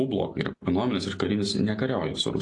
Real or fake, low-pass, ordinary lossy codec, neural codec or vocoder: real; 10.8 kHz; AAC, 48 kbps; none